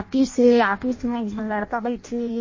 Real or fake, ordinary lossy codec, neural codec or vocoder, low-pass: fake; MP3, 32 kbps; codec, 16 kHz in and 24 kHz out, 0.6 kbps, FireRedTTS-2 codec; 7.2 kHz